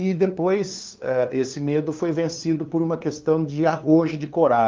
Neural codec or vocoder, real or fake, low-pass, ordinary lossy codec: codec, 16 kHz, 2 kbps, FunCodec, trained on LibriTTS, 25 frames a second; fake; 7.2 kHz; Opus, 24 kbps